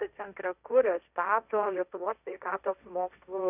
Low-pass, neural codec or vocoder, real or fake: 3.6 kHz; codec, 16 kHz, 1.1 kbps, Voila-Tokenizer; fake